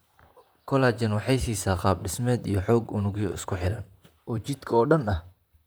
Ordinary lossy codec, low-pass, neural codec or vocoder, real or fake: none; none; vocoder, 44.1 kHz, 128 mel bands, Pupu-Vocoder; fake